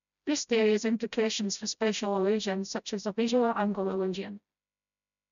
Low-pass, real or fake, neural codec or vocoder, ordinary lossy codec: 7.2 kHz; fake; codec, 16 kHz, 0.5 kbps, FreqCodec, smaller model; none